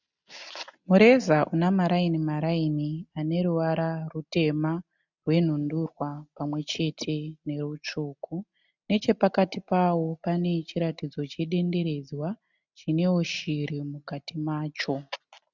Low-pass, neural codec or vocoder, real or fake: 7.2 kHz; none; real